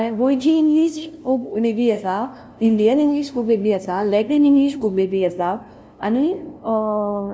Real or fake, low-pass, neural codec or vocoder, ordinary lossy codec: fake; none; codec, 16 kHz, 0.5 kbps, FunCodec, trained on LibriTTS, 25 frames a second; none